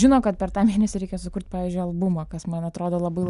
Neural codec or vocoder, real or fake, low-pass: none; real; 10.8 kHz